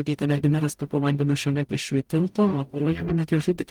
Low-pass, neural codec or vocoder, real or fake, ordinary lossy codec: 19.8 kHz; codec, 44.1 kHz, 0.9 kbps, DAC; fake; Opus, 32 kbps